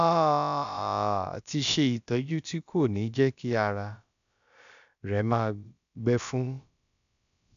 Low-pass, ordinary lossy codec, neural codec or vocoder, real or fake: 7.2 kHz; MP3, 96 kbps; codec, 16 kHz, about 1 kbps, DyCAST, with the encoder's durations; fake